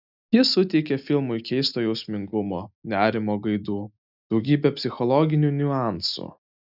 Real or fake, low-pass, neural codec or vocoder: real; 5.4 kHz; none